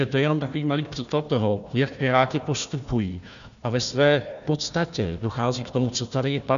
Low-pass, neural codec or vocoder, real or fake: 7.2 kHz; codec, 16 kHz, 1 kbps, FunCodec, trained on Chinese and English, 50 frames a second; fake